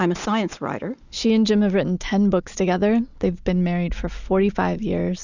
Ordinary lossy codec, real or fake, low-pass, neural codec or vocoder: Opus, 64 kbps; real; 7.2 kHz; none